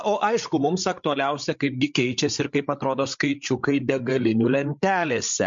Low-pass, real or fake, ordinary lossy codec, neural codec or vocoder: 7.2 kHz; fake; MP3, 48 kbps; codec, 16 kHz, 8 kbps, FreqCodec, larger model